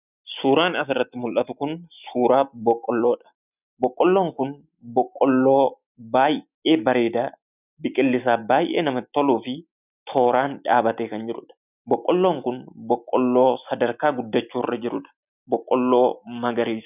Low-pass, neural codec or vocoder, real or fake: 3.6 kHz; none; real